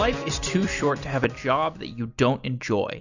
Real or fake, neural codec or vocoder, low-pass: fake; vocoder, 44.1 kHz, 128 mel bands every 256 samples, BigVGAN v2; 7.2 kHz